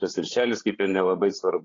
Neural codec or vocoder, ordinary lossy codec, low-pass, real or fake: codec, 16 kHz, 8 kbps, FreqCodec, larger model; AAC, 32 kbps; 7.2 kHz; fake